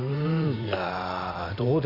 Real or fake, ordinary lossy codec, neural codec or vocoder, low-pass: fake; none; vocoder, 44.1 kHz, 128 mel bands every 512 samples, BigVGAN v2; 5.4 kHz